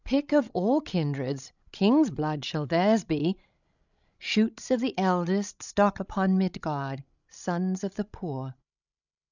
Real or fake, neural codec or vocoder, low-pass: fake; codec, 16 kHz, 16 kbps, FreqCodec, larger model; 7.2 kHz